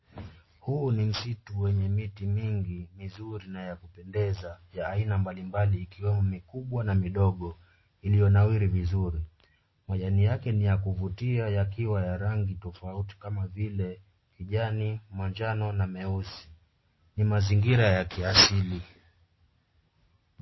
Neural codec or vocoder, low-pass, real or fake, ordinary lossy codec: none; 7.2 kHz; real; MP3, 24 kbps